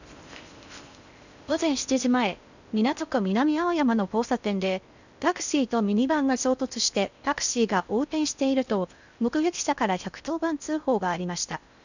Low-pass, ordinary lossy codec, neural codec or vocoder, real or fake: 7.2 kHz; none; codec, 16 kHz in and 24 kHz out, 0.6 kbps, FocalCodec, streaming, 4096 codes; fake